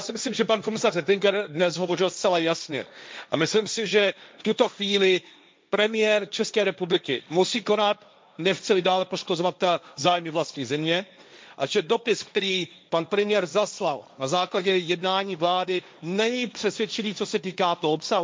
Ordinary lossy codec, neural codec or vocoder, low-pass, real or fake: none; codec, 16 kHz, 1.1 kbps, Voila-Tokenizer; none; fake